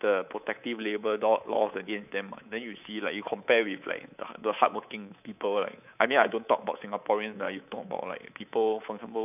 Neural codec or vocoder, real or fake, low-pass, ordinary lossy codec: codec, 24 kHz, 3.1 kbps, DualCodec; fake; 3.6 kHz; none